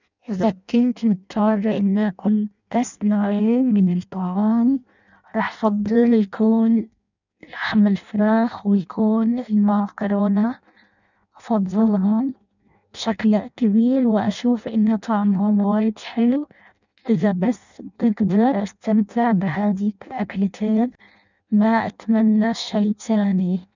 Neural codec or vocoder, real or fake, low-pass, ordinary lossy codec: codec, 16 kHz in and 24 kHz out, 0.6 kbps, FireRedTTS-2 codec; fake; 7.2 kHz; none